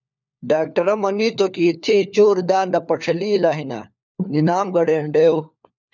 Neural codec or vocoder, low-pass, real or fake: codec, 16 kHz, 4 kbps, FunCodec, trained on LibriTTS, 50 frames a second; 7.2 kHz; fake